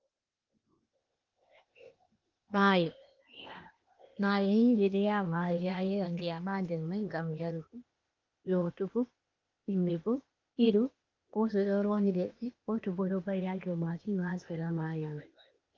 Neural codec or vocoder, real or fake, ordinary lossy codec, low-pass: codec, 16 kHz, 0.8 kbps, ZipCodec; fake; Opus, 32 kbps; 7.2 kHz